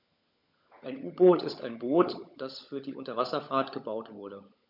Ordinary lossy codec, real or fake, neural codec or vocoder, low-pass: none; fake; codec, 16 kHz, 16 kbps, FunCodec, trained on LibriTTS, 50 frames a second; 5.4 kHz